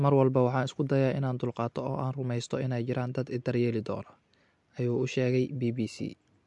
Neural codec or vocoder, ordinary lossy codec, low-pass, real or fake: none; MP3, 64 kbps; 10.8 kHz; real